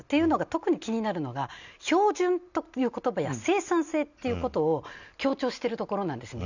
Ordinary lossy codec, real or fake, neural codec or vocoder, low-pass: none; real; none; 7.2 kHz